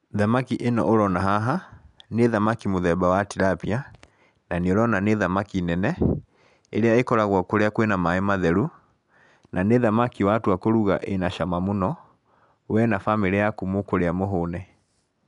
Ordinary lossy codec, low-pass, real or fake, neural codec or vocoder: none; 10.8 kHz; real; none